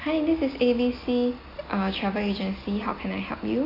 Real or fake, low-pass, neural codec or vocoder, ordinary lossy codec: real; 5.4 kHz; none; AAC, 24 kbps